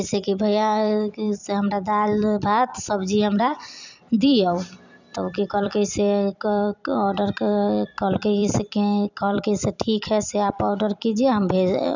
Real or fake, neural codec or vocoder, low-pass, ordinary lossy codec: fake; vocoder, 44.1 kHz, 128 mel bands every 256 samples, BigVGAN v2; 7.2 kHz; none